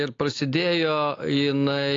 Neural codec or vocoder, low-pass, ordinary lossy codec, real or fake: none; 7.2 kHz; AAC, 32 kbps; real